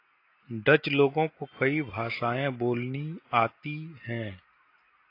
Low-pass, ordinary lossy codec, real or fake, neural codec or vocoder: 5.4 kHz; AAC, 32 kbps; real; none